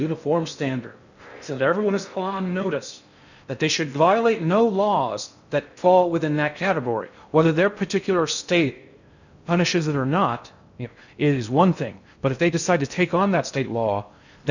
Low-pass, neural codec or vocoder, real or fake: 7.2 kHz; codec, 16 kHz in and 24 kHz out, 0.6 kbps, FocalCodec, streaming, 2048 codes; fake